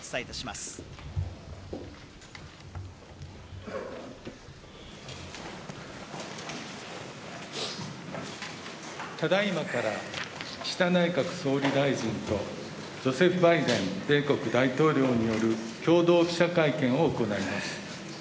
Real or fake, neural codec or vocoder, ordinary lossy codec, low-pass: real; none; none; none